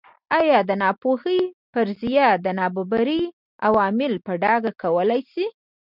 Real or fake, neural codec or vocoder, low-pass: real; none; 5.4 kHz